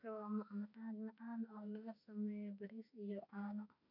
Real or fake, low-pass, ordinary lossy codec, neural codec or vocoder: fake; 5.4 kHz; none; autoencoder, 48 kHz, 32 numbers a frame, DAC-VAE, trained on Japanese speech